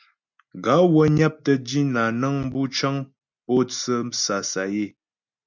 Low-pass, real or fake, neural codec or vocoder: 7.2 kHz; real; none